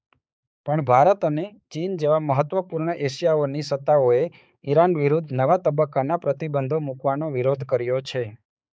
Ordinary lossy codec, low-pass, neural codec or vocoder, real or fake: none; none; codec, 16 kHz, 4 kbps, X-Codec, HuBERT features, trained on balanced general audio; fake